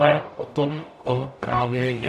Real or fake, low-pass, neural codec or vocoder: fake; 14.4 kHz; codec, 44.1 kHz, 0.9 kbps, DAC